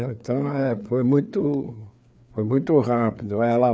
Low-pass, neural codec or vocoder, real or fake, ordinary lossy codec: none; codec, 16 kHz, 4 kbps, FreqCodec, larger model; fake; none